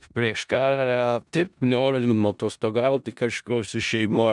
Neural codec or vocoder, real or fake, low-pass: codec, 16 kHz in and 24 kHz out, 0.4 kbps, LongCat-Audio-Codec, four codebook decoder; fake; 10.8 kHz